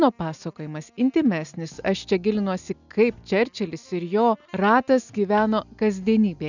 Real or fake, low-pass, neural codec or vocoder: real; 7.2 kHz; none